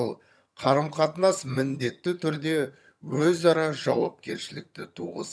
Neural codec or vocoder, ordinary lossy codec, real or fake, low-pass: vocoder, 22.05 kHz, 80 mel bands, HiFi-GAN; none; fake; none